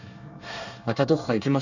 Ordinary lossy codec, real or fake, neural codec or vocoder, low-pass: none; fake; codec, 24 kHz, 1 kbps, SNAC; 7.2 kHz